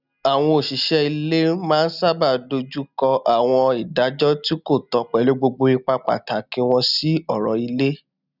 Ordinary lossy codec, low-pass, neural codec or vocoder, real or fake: none; 5.4 kHz; none; real